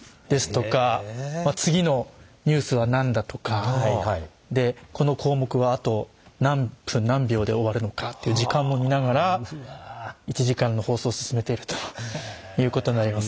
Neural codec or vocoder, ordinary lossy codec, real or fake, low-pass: none; none; real; none